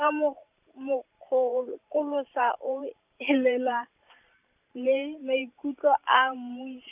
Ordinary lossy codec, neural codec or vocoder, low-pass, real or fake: none; vocoder, 44.1 kHz, 128 mel bands every 512 samples, BigVGAN v2; 3.6 kHz; fake